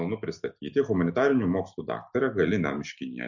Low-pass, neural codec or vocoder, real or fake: 7.2 kHz; none; real